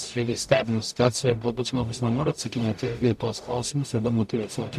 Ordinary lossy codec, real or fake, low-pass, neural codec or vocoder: AAC, 96 kbps; fake; 14.4 kHz; codec, 44.1 kHz, 0.9 kbps, DAC